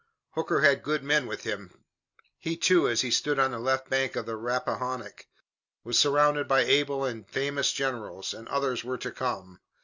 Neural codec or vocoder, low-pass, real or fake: none; 7.2 kHz; real